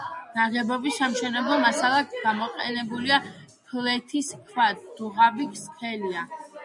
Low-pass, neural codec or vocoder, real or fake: 10.8 kHz; none; real